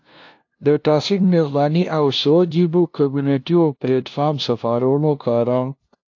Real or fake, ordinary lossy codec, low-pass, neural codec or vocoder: fake; AAC, 48 kbps; 7.2 kHz; codec, 16 kHz, 0.5 kbps, FunCodec, trained on LibriTTS, 25 frames a second